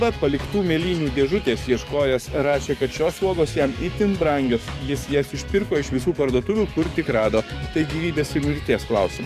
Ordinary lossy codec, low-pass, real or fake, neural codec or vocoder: AAC, 96 kbps; 14.4 kHz; fake; codec, 44.1 kHz, 7.8 kbps, DAC